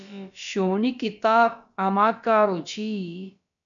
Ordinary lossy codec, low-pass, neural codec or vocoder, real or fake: MP3, 96 kbps; 7.2 kHz; codec, 16 kHz, about 1 kbps, DyCAST, with the encoder's durations; fake